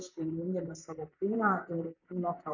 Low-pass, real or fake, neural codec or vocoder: 7.2 kHz; fake; vocoder, 44.1 kHz, 128 mel bands, Pupu-Vocoder